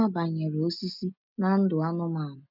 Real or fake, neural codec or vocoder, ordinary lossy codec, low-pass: real; none; none; 5.4 kHz